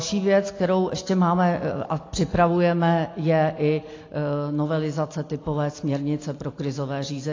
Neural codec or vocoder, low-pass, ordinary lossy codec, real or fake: none; 7.2 kHz; AAC, 32 kbps; real